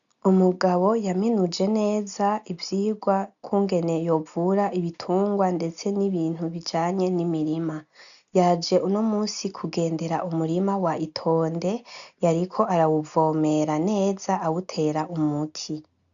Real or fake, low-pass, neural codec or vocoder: real; 7.2 kHz; none